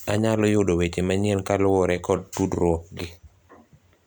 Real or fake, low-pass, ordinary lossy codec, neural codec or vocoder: real; none; none; none